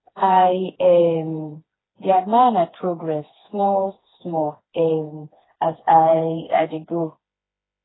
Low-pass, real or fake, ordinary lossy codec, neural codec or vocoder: 7.2 kHz; fake; AAC, 16 kbps; codec, 16 kHz, 2 kbps, FreqCodec, smaller model